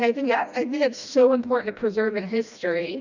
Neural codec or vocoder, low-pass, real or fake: codec, 16 kHz, 1 kbps, FreqCodec, smaller model; 7.2 kHz; fake